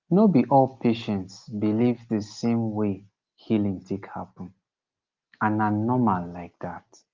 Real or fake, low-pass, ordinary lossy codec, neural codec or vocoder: real; 7.2 kHz; Opus, 32 kbps; none